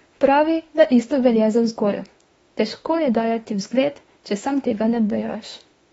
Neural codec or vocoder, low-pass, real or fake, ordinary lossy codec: autoencoder, 48 kHz, 32 numbers a frame, DAC-VAE, trained on Japanese speech; 19.8 kHz; fake; AAC, 24 kbps